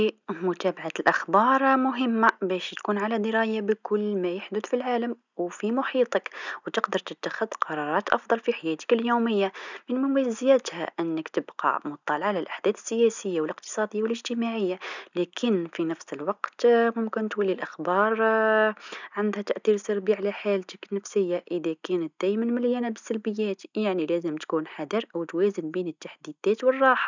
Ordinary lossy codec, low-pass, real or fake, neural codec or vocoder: none; 7.2 kHz; real; none